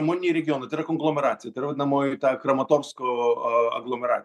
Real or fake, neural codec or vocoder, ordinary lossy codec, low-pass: real; none; MP3, 96 kbps; 14.4 kHz